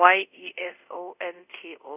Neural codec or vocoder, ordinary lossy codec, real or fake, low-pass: codec, 24 kHz, 0.5 kbps, DualCodec; none; fake; 3.6 kHz